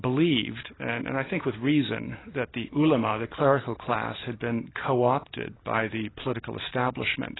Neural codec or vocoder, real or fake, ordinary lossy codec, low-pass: none; real; AAC, 16 kbps; 7.2 kHz